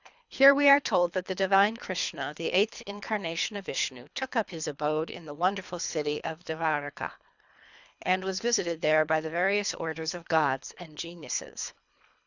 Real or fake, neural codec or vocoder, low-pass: fake; codec, 24 kHz, 3 kbps, HILCodec; 7.2 kHz